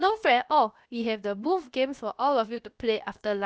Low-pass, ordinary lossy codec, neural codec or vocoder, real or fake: none; none; codec, 16 kHz, about 1 kbps, DyCAST, with the encoder's durations; fake